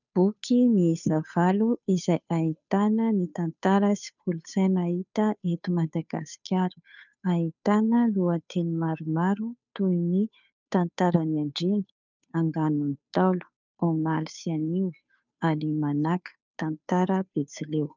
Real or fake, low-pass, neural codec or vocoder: fake; 7.2 kHz; codec, 16 kHz, 2 kbps, FunCodec, trained on Chinese and English, 25 frames a second